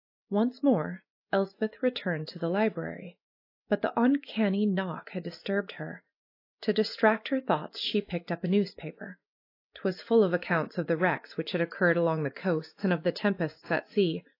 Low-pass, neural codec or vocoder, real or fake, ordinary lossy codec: 5.4 kHz; none; real; AAC, 32 kbps